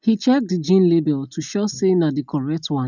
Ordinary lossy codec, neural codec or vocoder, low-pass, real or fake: none; none; 7.2 kHz; real